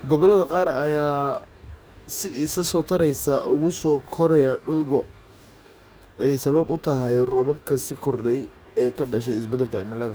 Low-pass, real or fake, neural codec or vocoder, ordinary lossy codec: none; fake; codec, 44.1 kHz, 2.6 kbps, DAC; none